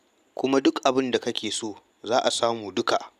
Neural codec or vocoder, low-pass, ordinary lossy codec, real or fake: none; 14.4 kHz; none; real